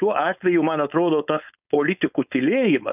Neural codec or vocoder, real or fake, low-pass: codec, 16 kHz, 4.8 kbps, FACodec; fake; 3.6 kHz